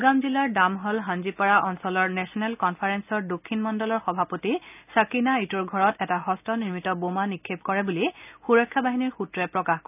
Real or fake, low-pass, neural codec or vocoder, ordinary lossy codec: real; 3.6 kHz; none; none